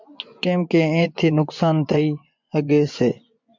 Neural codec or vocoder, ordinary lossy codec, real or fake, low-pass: vocoder, 24 kHz, 100 mel bands, Vocos; MP3, 64 kbps; fake; 7.2 kHz